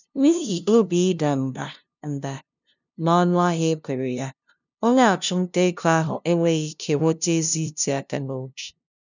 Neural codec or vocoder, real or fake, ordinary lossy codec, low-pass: codec, 16 kHz, 0.5 kbps, FunCodec, trained on LibriTTS, 25 frames a second; fake; none; 7.2 kHz